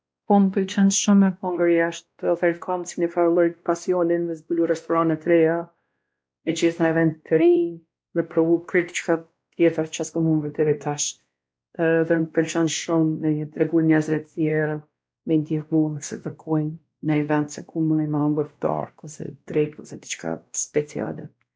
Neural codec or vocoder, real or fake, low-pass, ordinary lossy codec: codec, 16 kHz, 1 kbps, X-Codec, WavLM features, trained on Multilingual LibriSpeech; fake; none; none